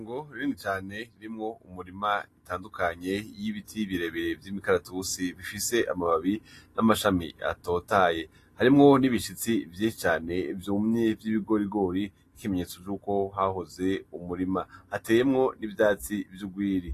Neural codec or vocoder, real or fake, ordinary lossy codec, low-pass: none; real; AAC, 48 kbps; 14.4 kHz